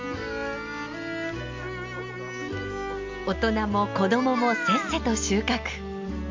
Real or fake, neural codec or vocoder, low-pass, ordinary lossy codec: real; none; 7.2 kHz; MP3, 64 kbps